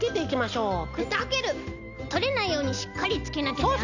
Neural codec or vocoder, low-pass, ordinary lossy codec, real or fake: none; 7.2 kHz; none; real